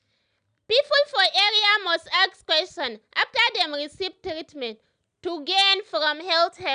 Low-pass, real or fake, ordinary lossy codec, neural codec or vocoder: 9.9 kHz; real; none; none